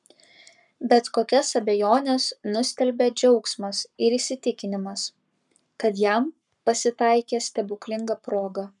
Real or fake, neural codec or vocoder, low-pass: fake; codec, 44.1 kHz, 7.8 kbps, Pupu-Codec; 10.8 kHz